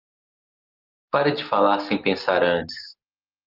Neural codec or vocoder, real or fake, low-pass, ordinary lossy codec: none; real; 5.4 kHz; Opus, 32 kbps